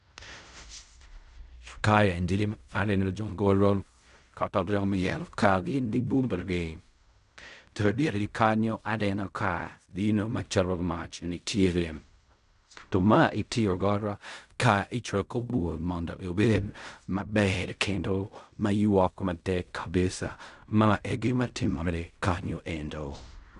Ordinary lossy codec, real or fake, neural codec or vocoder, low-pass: none; fake; codec, 16 kHz in and 24 kHz out, 0.4 kbps, LongCat-Audio-Codec, fine tuned four codebook decoder; 10.8 kHz